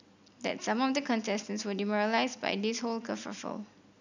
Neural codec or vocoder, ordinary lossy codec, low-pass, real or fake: none; none; 7.2 kHz; real